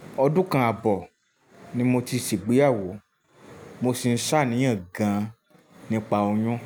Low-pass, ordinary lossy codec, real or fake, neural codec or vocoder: none; none; real; none